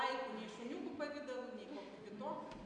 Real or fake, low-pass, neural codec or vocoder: real; 9.9 kHz; none